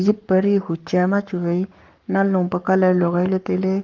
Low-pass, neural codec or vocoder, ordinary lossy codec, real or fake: 7.2 kHz; codec, 44.1 kHz, 7.8 kbps, Pupu-Codec; Opus, 32 kbps; fake